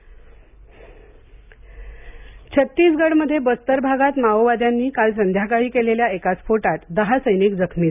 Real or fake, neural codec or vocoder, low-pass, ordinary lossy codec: real; none; 3.6 kHz; none